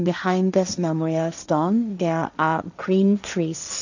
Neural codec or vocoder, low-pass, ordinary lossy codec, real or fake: codec, 16 kHz, 1.1 kbps, Voila-Tokenizer; 7.2 kHz; none; fake